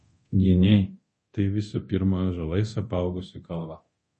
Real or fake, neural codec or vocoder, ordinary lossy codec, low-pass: fake; codec, 24 kHz, 0.9 kbps, DualCodec; MP3, 32 kbps; 10.8 kHz